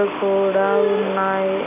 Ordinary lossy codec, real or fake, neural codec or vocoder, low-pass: none; real; none; 3.6 kHz